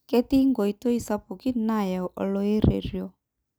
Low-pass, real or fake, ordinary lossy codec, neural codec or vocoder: none; real; none; none